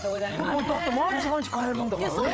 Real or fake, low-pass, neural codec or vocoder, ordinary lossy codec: fake; none; codec, 16 kHz, 8 kbps, FreqCodec, larger model; none